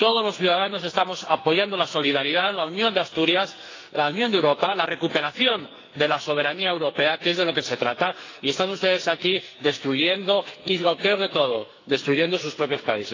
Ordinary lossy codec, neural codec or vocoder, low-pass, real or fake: AAC, 32 kbps; codec, 44.1 kHz, 2.6 kbps, SNAC; 7.2 kHz; fake